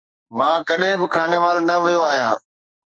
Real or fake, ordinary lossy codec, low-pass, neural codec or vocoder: fake; MP3, 48 kbps; 9.9 kHz; codec, 44.1 kHz, 2.6 kbps, SNAC